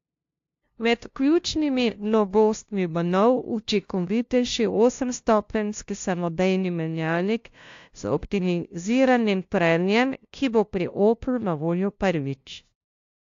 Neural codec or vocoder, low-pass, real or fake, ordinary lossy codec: codec, 16 kHz, 0.5 kbps, FunCodec, trained on LibriTTS, 25 frames a second; 7.2 kHz; fake; AAC, 48 kbps